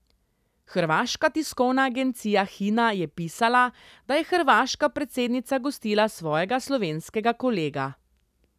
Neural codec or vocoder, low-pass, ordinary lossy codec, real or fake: none; 14.4 kHz; none; real